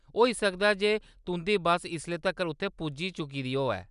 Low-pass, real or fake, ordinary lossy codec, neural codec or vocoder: 10.8 kHz; real; none; none